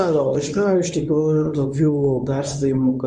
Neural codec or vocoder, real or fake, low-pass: codec, 24 kHz, 0.9 kbps, WavTokenizer, medium speech release version 1; fake; 10.8 kHz